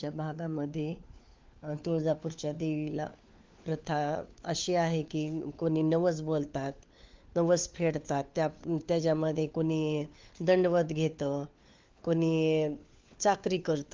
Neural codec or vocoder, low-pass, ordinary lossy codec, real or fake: codec, 16 kHz, 4 kbps, FunCodec, trained on LibriTTS, 50 frames a second; 7.2 kHz; Opus, 32 kbps; fake